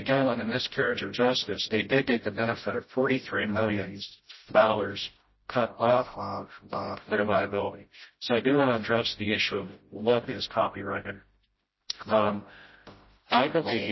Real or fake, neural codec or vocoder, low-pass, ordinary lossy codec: fake; codec, 16 kHz, 0.5 kbps, FreqCodec, smaller model; 7.2 kHz; MP3, 24 kbps